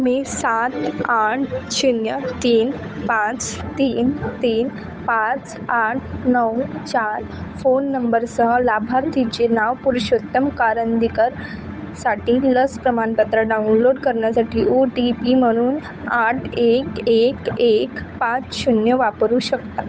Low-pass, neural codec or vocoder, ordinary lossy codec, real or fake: none; codec, 16 kHz, 8 kbps, FunCodec, trained on Chinese and English, 25 frames a second; none; fake